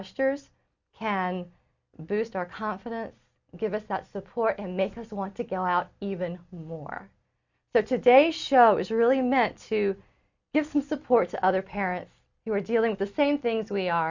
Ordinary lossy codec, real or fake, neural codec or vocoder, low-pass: Opus, 64 kbps; real; none; 7.2 kHz